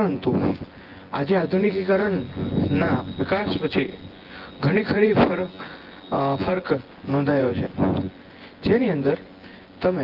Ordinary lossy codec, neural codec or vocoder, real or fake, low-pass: Opus, 16 kbps; vocoder, 24 kHz, 100 mel bands, Vocos; fake; 5.4 kHz